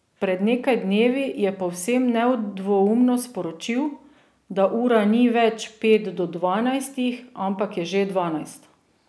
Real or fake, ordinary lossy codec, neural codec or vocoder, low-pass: real; none; none; none